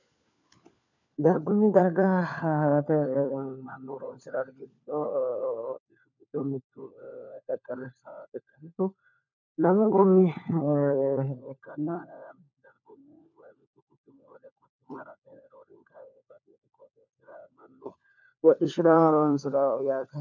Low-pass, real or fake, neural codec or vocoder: 7.2 kHz; fake; codec, 16 kHz, 4 kbps, FunCodec, trained on LibriTTS, 50 frames a second